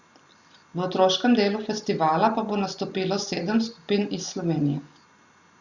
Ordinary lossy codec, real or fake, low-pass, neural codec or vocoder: Opus, 64 kbps; real; 7.2 kHz; none